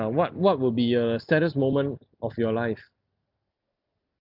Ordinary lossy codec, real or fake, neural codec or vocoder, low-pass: none; real; none; 5.4 kHz